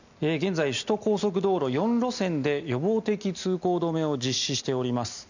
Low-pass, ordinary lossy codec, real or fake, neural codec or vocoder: 7.2 kHz; none; real; none